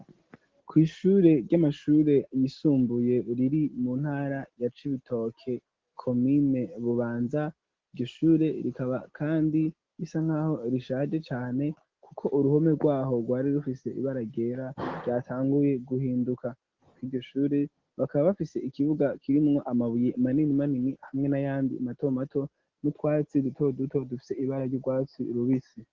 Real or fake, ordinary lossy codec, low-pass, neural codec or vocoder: real; Opus, 16 kbps; 7.2 kHz; none